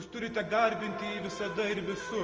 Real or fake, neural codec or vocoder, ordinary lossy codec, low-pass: real; none; Opus, 24 kbps; 7.2 kHz